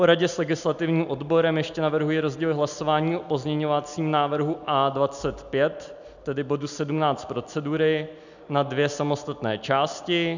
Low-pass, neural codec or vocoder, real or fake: 7.2 kHz; none; real